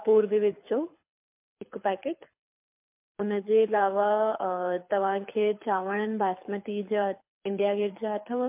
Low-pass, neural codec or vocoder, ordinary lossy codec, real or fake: 3.6 kHz; codec, 24 kHz, 6 kbps, HILCodec; MP3, 32 kbps; fake